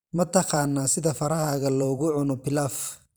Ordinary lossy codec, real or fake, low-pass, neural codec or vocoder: none; fake; none; vocoder, 44.1 kHz, 128 mel bands every 512 samples, BigVGAN v2